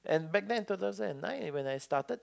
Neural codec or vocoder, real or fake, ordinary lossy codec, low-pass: none; real; none; none